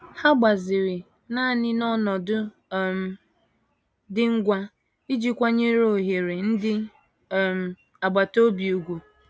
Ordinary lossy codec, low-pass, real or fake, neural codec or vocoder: none; none; real; none